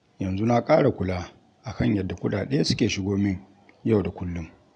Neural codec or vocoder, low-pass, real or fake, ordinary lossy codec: none; 9.9 kHz; real; none